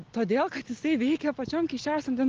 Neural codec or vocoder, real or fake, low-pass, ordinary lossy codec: none; real; 7.2 kHz; Opus, 16 kbps